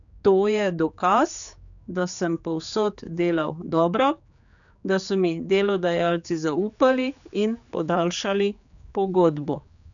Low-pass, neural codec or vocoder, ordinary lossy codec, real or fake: 7.2 kHz; codec, 16 kHz, 4 kbps, X-Codec, HuBERT features, trained on general audio; none; fake